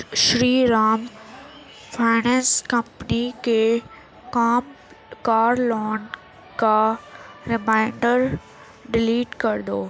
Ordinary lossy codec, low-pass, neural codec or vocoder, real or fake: none; none; none; real